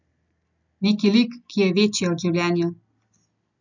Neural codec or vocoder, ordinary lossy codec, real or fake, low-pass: none; none; real; 7.2 kHz